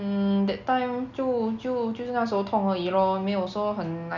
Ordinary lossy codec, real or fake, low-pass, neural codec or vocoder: none; real; 7.2 kHz; none